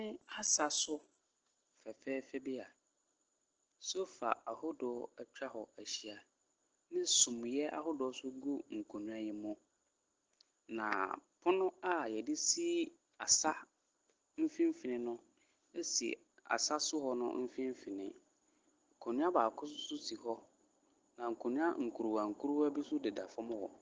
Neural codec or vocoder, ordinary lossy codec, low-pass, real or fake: none; Opus, 16 kbps; 7.2 kHz; real